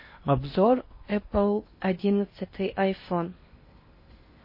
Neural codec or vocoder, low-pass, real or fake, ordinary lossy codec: codec, 16 kHz in and 24 kHz out, 0.8 kbps, FocalCodec, streaming, 65536 codes; 5.4 kHz; fake; MP3, 24 kbps